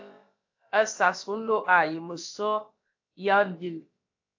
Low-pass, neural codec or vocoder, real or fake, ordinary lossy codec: 7.2 kHz; codec, 16 kHz, about 1 kbps, DyCAST, with the encoder's durations; fake; AAC, 48 kbps